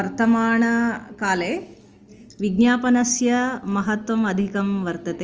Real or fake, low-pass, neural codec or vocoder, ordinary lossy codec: real; 7.2 kHz; none; Opus, 24 kbps